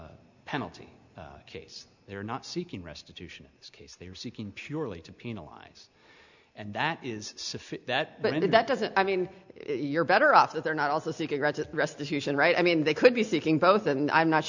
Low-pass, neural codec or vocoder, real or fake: 7.2 kHz; none; real